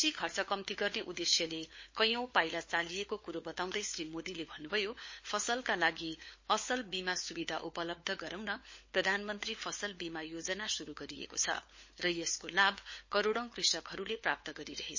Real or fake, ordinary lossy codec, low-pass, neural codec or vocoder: fake; MP3, 32 kbps; 7.2 kHz; codec, 16 kHz, 4 kbps, FunCodec, trained on LibriTTS, 50 frames a second